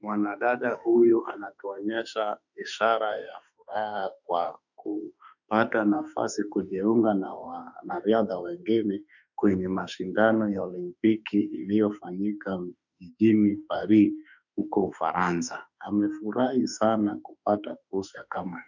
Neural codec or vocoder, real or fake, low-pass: autoencoder, 48 kHz, 32 numbers a frame, DAC-VAE, trained on Japanese speech; fake; 7.2 kHz